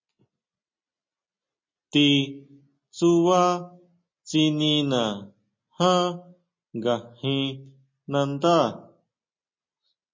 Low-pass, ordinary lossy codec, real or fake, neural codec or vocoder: 7.2 kHz; MP3, 32 kbps; real; none